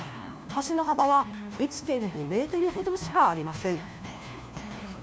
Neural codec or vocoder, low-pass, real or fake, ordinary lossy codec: codec, 16 kHz, 1 kbps, FunCodec, trained on LibriTTS, 50 frames a second; none; fake; none